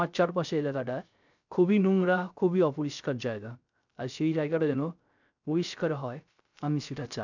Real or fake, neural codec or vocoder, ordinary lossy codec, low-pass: fake; codec, 16 kHz, 0.3 kbps, FocalCodec; none; 7.2 kHz